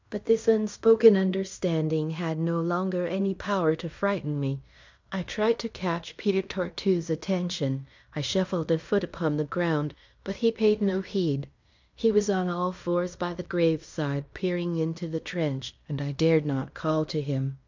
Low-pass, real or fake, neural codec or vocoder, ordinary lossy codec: 7.2 kHz; fake; codec, 16 kHz in and 24 kHz out, 0.9 kbps, LongCat-Audio-Codec, fine tuned four codebook decoder; MP3, 64 kbps